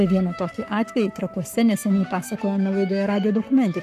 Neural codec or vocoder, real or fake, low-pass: codec, 44.1 kHz, 7.8 kbps, Pupu-Codec; fake; 14.4 kHz